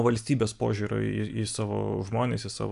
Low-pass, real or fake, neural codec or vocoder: 10.8 kHz; real; none